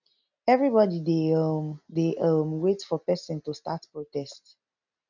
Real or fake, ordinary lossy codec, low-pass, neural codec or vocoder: real; none; 7.2 kHz; none